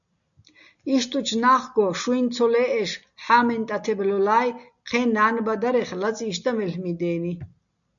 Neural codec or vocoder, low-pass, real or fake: none; 7.2 kHz; real